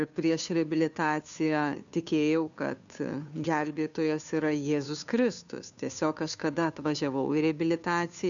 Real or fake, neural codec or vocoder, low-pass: fake; codec, 16 kHz, 2 kbps, FunCodec, trained on Chinese and English, 25 frames a second; 7.2 kHz